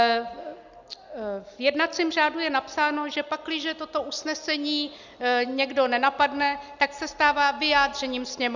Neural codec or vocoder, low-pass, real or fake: none; 7.2 kHz; real